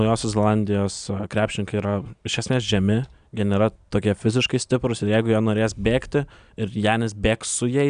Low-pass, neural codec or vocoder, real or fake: 9.9 kHz; none; real